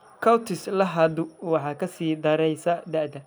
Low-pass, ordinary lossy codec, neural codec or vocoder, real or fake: none; none; none; real